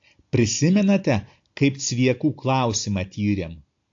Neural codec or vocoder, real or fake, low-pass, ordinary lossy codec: none; real; 7.2 kHz; AAC, 48 kbps